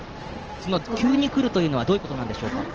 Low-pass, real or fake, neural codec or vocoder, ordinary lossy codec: 7.2 kHz; real; none; Opus, 16 kbps